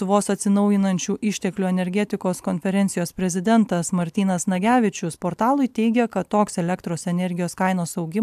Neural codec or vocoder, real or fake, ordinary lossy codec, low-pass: none; real; AAC, 96 kbps; 14.4 kHz